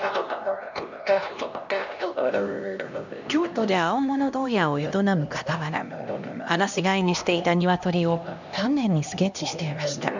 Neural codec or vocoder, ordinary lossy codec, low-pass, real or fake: codec, 16 kHz, 1 kbps, X-Codec, HuBERT features, trained on LibriSpeech; none; 7.2 kHz; fake